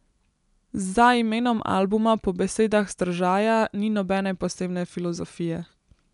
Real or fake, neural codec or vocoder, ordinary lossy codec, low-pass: real; none; none; 10.8 kHz